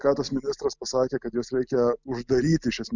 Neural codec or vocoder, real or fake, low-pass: none; real; 7.2 kHz